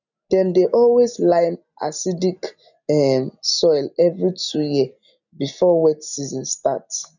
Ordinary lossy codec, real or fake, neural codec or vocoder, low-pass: none; real; none; 7.2 kHz